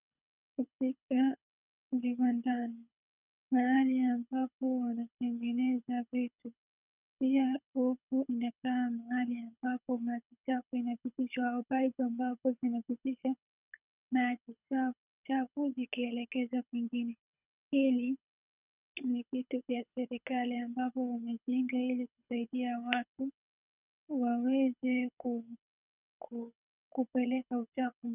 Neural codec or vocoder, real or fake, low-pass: codec, 24 kHz, 6 kbps, HILCodec; fake; 3.6 kHz